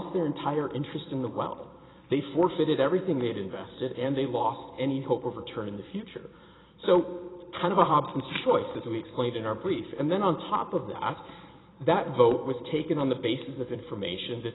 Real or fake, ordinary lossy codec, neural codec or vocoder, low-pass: fake; AAC, 16 kbps; vocoder, 44.1 kHz, 128 mel bands every 256 samples, BigVGAN v2; 7.2 kHz